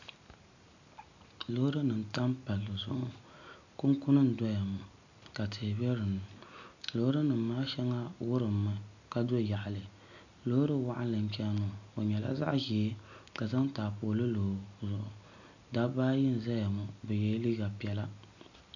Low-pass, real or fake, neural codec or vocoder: 7.2 kHz; real; none